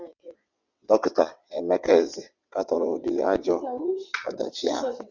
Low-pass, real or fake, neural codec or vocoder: 7.2 kHz; fake; vocoder, 22.05 kHz, 80 mel bands, WaveNeXt